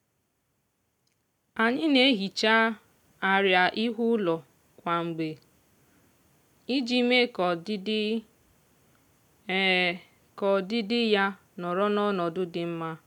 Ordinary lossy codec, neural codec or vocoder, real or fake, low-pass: none; none; real; 19.8 kHz